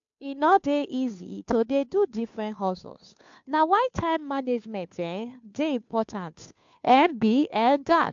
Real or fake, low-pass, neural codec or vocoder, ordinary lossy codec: fake; 7.2 kHz; codec, 16 kHz, 2 kbps, FunCodec, trained on Chinese and English, 25 frames a second; AAC, 64 kbps